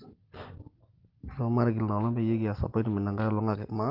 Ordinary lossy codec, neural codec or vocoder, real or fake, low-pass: Opus, 16 kbps; none; real; 5.4 kHz